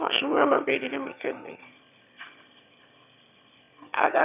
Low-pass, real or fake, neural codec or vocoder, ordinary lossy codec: 3.6 kHz; fake; autoencoder, 22.05 kHz, a latent of 192 numbers a frame, VITS, trained on one speaker; none